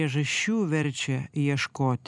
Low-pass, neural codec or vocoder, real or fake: 10.8 kHz; vocoder, 44.1 kHz, 128 mel bands every 256 samples, BigVGAN v2; fake